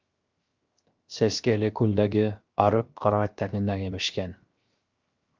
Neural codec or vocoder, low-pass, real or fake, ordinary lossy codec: codec, 16 kHz, 0.7 kbps, FocalCodec; 7.2 kHz; fake; Opus, 24 kbps